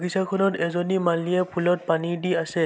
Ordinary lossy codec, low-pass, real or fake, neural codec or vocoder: none; none; real; none